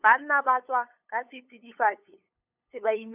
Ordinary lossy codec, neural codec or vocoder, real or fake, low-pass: none; codec, 16 kHz, 8 kbps, FunCodec, trained on LibriTTS, 25 frames a second; fake; 3.6 kHz